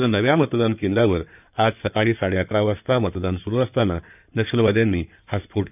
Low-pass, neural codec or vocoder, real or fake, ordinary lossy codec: 3.6 kHz; codec, 16 kHz in and 24 kHz out, 2.2 kbps, FireRedTTS-2 codec; fake; none